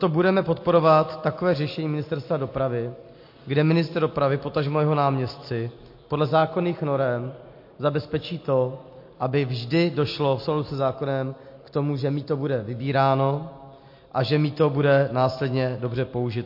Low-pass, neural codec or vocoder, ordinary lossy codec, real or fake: 5.4 kHz; none; MP3, 32 kbps; real